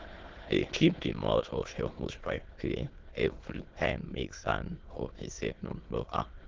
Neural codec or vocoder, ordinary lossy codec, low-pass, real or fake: autoencoder, 22.05 kHz, a latent of 192 numbers a frame, VITS, trained on many speakers; Opus, 16 kbps; 7.2 kHz; fake